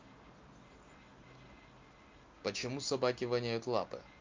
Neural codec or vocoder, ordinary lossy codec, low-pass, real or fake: none; Opus, 24 kbps; 7.2 kHz; real